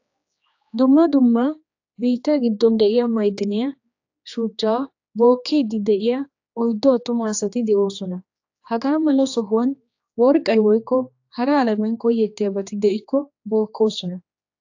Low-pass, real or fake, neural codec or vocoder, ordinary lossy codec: 7.2 kHz; fake; codec, 16 kHz, 2 kbps, X-Codec, HuBERT features, trained on general audio; AAC, 48 kbps